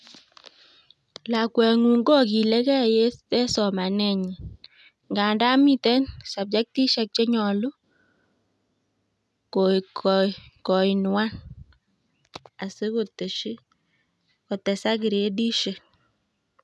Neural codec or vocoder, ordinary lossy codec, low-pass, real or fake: none; none; none; real